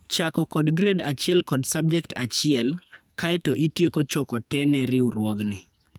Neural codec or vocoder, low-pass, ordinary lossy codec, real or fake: codec, 44.1 kHz, 2.6 kbps, SNAC; none; none; fake